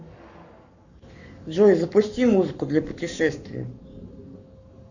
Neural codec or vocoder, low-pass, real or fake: codec, 44.1 kHz, 7.8 kbps, Pupu-Codec; 7.2 kHz; fake